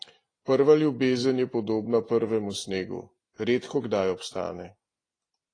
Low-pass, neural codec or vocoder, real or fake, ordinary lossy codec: 9.9 kHz; none; real; AAC, 32 kbps